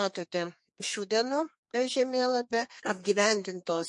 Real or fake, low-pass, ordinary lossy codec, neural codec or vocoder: fake; 10.8 kHz; MP3, 48 kbps; codec, 32 kHz, 1.9 kbps, SNAC